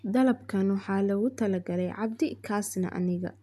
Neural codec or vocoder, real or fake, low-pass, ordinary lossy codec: none; real; 14.4 kHz; MP3, 96 kbps